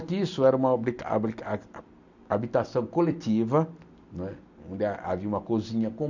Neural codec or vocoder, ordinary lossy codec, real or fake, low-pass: none; none; real; 7.2 kHz